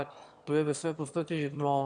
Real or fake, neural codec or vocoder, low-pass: fake; autoencoder, 22.05 kHz, a latent of 192 numbers a frame, VITS, trained on one speaker; 9.9 kHz